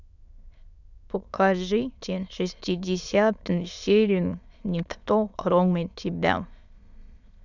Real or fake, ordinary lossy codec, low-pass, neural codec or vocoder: fake; none; 7.2 kHz; autoencoder, 22.05 kHz, a latent of 192 numbers a frame, VITS, trained on many speakers